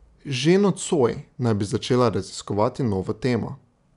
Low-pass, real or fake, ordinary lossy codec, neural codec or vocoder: 10.8 kHz; real; none; none